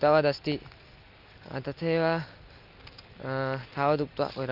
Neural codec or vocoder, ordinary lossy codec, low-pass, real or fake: none; Opus, 32 kbps; 5.4 kHz; real